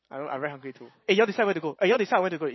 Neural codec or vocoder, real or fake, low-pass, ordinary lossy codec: vocoder, 44.1 kHz, 128 mel bands every 512 samples, BigVGAN v2; fake; 7.2 kHz; MP3, 24 kbps